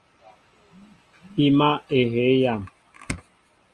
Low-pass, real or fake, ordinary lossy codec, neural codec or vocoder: 10.8 kHz; real; Opus, 32 kbps; none